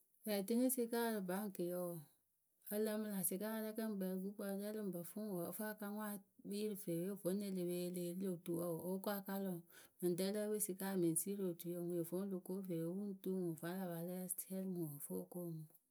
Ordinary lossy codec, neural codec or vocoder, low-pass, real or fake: none; none; none; real